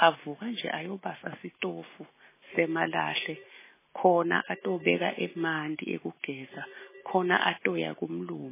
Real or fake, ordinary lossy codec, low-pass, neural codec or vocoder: real; MP3, 16 kbps; 3.6 kHz; none